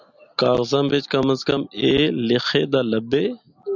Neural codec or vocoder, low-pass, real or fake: none; 7.2 kHz; real